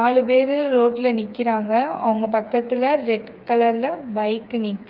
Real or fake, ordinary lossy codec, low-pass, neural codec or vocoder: fake; Opus, 24 kbps; 5.4 kHz; codec, 16 kHz, 4 kbps, FreqCodec, smaller model